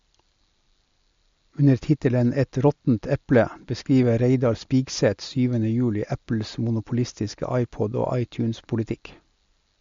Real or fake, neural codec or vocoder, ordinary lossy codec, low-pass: real; none; MP3, 48 kbps; 7.2 kHz